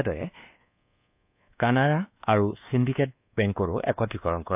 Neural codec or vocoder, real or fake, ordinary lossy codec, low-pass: codec, 24 kHz, 1.2 kbps, DualCodec; fake; AAC, 32 kbps; 3.6 kHz